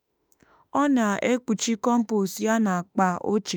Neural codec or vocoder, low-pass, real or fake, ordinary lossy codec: autoencoder, 48 kHz, 32 numbers a frame, DAC-VAE, trained on Japanese speech; none; fake; none